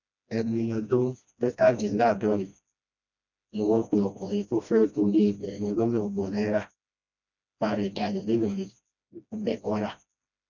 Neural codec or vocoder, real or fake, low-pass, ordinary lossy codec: codec, 16 kHz, 1 kbps, FreqCodec, smaller model; fake; 7.2 kHz; none